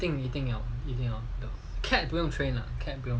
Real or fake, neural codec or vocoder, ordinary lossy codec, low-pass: real; none; none; none